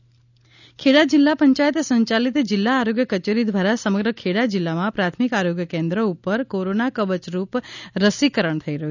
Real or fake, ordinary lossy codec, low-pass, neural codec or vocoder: real; none; 7.2 kHz; none